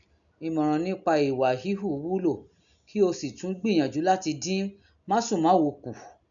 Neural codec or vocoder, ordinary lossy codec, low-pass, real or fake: none; MP3, 96 kbps; 7.2 kHz; real